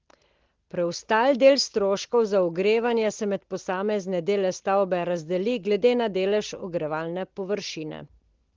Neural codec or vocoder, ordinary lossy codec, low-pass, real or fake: none; Opus, 16 kbps; 7.2 kHz; real